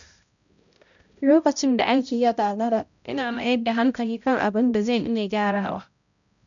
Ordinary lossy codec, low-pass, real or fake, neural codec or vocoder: none; 7.2 kHz; fake; codec, 16 kHz, 0.5 kbps, X-Codec, HuBERT features, trained on balanced general audio